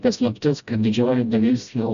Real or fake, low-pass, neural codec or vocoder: fake; 7.2 kHz; codec, 16 kHz, 0.5 kbps, FreqCodec, smaller model